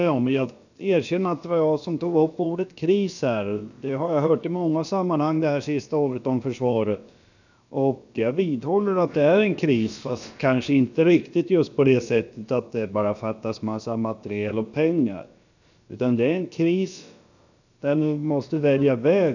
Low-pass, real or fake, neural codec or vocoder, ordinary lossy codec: 7.2 kHz; fake; codec, 16 kHz, about 1 kbps, DyCAST, with the encoder's durations; none